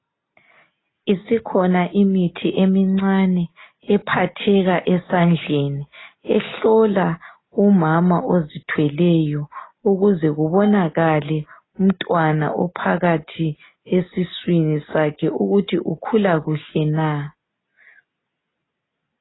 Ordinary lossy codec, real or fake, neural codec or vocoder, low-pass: AAC, 16 kbps; real; none; 7.2 kHz